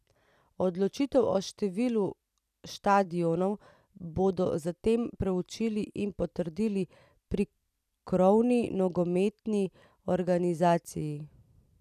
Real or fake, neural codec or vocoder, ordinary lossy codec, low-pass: fake; vocoder, 44.1 kHz, 128 mel bands every 256 samples, BigVGAN v2; none; 14.4 kHz